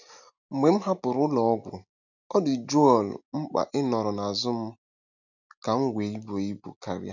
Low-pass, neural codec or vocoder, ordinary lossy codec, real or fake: 7.2 kHz; none; none; real